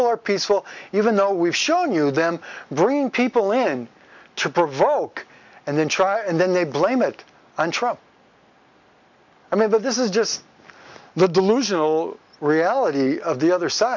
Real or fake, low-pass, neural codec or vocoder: real; 7.2 kHz; none